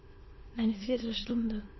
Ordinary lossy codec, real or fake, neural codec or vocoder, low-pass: MP3, 24 kbps; fake; autoencoder, 22.05 kHz, a latent of 192 numbers a frame, VITS, trained on many speakers; 7.2 kHz